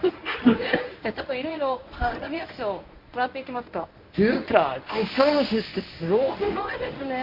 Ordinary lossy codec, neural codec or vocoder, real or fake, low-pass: none; codec, 24 kHz, 0.9 kbps, WavTokenizer, medium speech release version 1; fake; 5.4 kHz